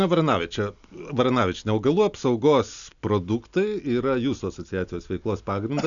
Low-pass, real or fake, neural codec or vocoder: 7.2 kHz; real; none